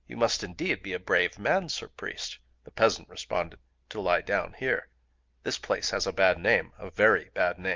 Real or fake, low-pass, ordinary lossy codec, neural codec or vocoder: real; 7.2 kHz; Opus, 24 kbps; none